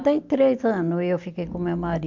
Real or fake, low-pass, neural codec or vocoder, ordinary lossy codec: real; 7.2 kHz; none; MP3, 64 kbps